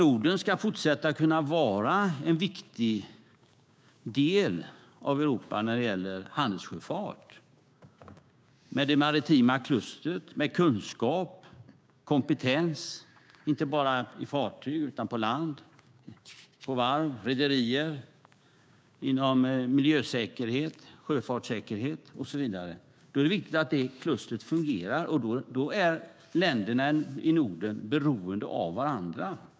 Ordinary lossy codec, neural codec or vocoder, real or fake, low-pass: none; codec, 16 kHz, 6 kbps, DAC; fake; none